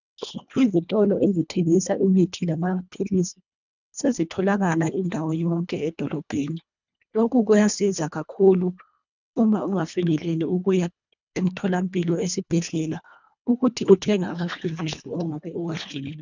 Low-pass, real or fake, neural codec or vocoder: 7.2 kHz; fake; codec, 24 kHz, 1.5 kbps, HILCodec